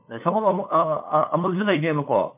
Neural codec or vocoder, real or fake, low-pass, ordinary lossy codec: codec, 16 kHz, 8 kbps, FunCodec, trained on LibriTTS, 25 frames a second; fake; 3.6 kHz; MP3, 32 kbps